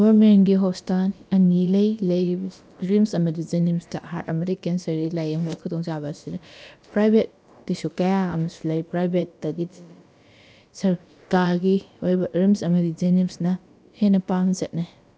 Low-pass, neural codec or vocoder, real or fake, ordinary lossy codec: none; codec, 16 kHz, about 1 kbps, DyCAST, with the encoder's durations; fake; none